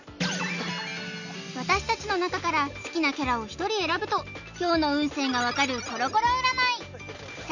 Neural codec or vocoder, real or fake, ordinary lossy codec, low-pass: none; real; none; 7.2 kHz